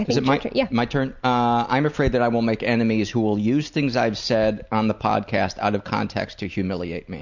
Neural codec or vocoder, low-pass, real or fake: none; 7.2 kHz; real